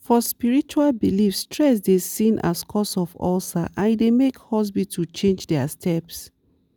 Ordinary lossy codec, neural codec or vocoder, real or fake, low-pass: none; none; real; none